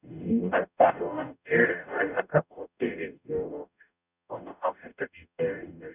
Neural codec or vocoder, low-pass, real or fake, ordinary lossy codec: codec, 44.1 kHz, 0.9 kbps, DAC; 3.6 kHz; fake; none